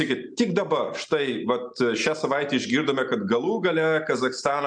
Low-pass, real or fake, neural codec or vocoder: 9.9 kHz; real; none